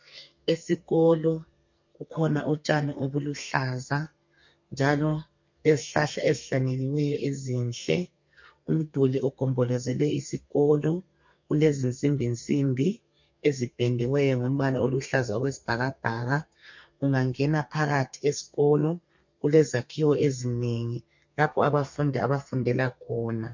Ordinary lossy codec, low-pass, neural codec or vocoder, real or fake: MP3, 48 kbps; 7.2 kHz; codec, 32 kHz, 1.9 kbps, SNAC; fake